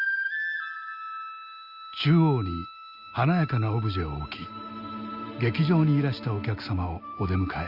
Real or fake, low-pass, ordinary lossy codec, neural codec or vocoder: real; 5.4 kHz; Opus, 64 kbps; none